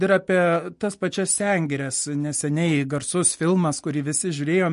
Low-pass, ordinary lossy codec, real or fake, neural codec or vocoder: 10.8 kHz; MP3, 48 kbps; real; none